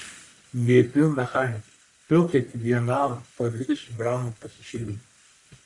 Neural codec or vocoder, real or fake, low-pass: codec, 44.1 kHz, 1.7 kbps, Pupu-Codec; fake; 10.8 kHz